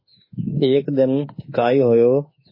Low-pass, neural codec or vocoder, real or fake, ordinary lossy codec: 5.4 kHz; autoencoder, 48 kHz, 32 numbers a frame, DAC-VAE, trained on Japanese speech; fake; MP3, 24 kbps